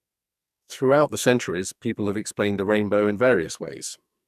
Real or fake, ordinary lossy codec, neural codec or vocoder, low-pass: fake; none; codec, 44.1 kHz, 2.6 kbps, SNAC; 14.4 kHz